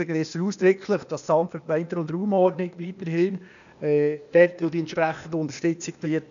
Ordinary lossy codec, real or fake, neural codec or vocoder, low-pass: none; fake; codec, 16 kHz, 0.8 kbps, ZipCodec; 7.2 kHz